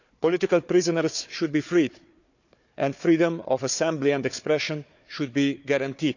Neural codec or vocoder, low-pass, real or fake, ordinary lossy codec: codec, 16 kHz, 4 kbps, FunCodec, trained on Chinese and English, 50 frames a second; 7.2 kHz; fake; none